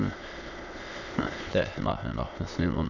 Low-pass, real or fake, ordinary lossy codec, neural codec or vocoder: 7.2 kHz; fake; none; autoencoder, 22.05 kHz, a latent of 192 numbers a frame, VITS, trained on many speakers